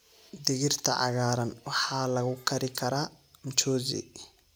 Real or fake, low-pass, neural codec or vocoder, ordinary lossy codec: real; none; none; none